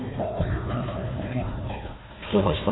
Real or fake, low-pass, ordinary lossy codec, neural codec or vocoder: fake; 7.2 kHz; AAC, 16 kbps; codec, 16 kHz, 1 kbps, FunCodec, trained on Chinese and English, 50 frames a second